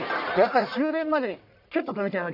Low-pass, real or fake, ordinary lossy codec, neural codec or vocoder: 5.4 kHz; fake; none; codec, 44.1 kHz, 1.7 kbps, Pupu-Codec